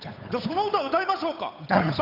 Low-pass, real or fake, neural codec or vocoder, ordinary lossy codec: 5.4 kHz; fake; codec, 16 kHz, 8 kbps, FunCodec, trained on Chinese and English, 25 frames a second; none